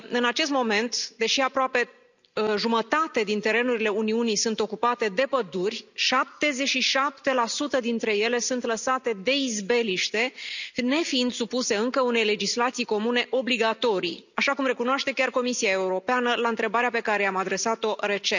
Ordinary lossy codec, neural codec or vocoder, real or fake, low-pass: none; none; real; 7.2 kHz